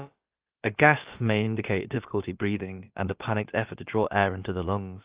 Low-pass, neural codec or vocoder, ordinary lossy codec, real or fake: 3.6 kHz; codec, 16 kHz, about 1 kbps, DyCAST, with the encoder's durations; Opus, 32 kbps; fake